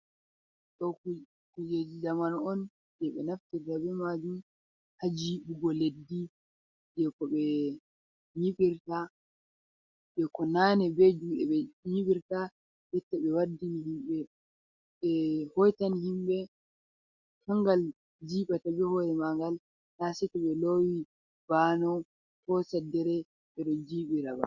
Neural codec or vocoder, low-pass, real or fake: none; 7.2 kHz; real